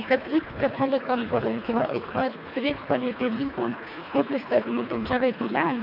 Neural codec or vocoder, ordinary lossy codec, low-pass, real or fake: codec, 24 kHz, 1.5 kbps, HILCodec; MP3, 48 kbps; 5.4 kHz; fake